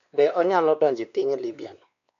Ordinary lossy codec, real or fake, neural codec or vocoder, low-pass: MP3, 64 kbps; fake; codec, 16 kHz, 2 kbps, X-Codec, WavLM features, trained on Multilingual LibriSpeech; 7.2 kHz